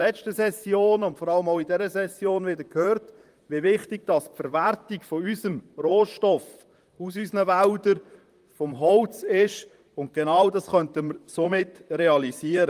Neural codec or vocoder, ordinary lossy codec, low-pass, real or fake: vocoder, 44.1 kHz, 128 mel bands, Pupu-Vocoder; Opus, 32 kbps; 14.4 kHz; fake